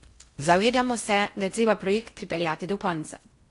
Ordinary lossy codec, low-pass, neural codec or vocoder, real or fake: AAC, 48 kbps; 10.8 kHz; codec, 16 kHz in and 24 kHz out, 0.6 kbps, FocalCodec, streaming, 4096 codes; fake